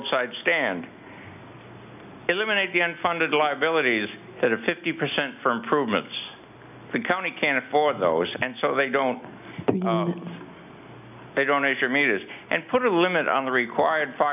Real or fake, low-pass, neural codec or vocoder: real; 3.6 kHz; none